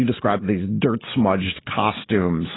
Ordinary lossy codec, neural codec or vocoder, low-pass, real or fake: AAC, 16 kbps; none; 7.2 kHz; real